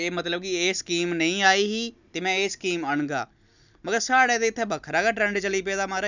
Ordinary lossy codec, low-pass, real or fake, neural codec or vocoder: none; 7.2 kHz; real; none